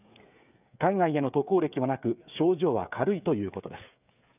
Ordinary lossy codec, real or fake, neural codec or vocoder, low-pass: none; fake; codec, 16 kHz, 8 kbps, FreqCodec, smaller model; 3.6 kHz